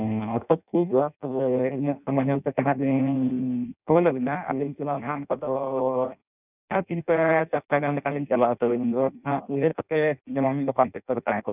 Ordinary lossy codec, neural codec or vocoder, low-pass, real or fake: none; codec, 16 kHz in and 24 kHz out, 0.6 kbps, FireRedTTS-2 codec; 3.6 kHz; fake